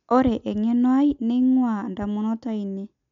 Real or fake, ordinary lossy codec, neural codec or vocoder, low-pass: real; none; none; 7.2 kHz